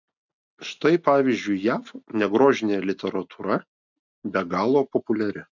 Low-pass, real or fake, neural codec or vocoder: 7.2 kHz; real; none